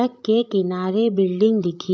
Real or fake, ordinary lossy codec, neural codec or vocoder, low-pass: fake; none; codec, 16 kHz, 16 kbps, FreqCodec, larger model; none